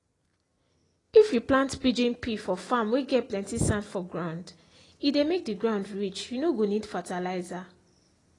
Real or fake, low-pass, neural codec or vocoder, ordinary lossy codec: real; 10.8 kHz; none; AAC, 32 kbps